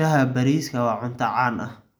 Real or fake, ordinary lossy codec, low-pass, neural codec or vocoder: real; none; none; none